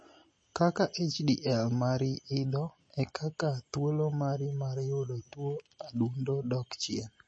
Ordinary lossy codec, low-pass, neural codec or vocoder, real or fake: MP3, 32 kbps; 9.9 kHz; none; real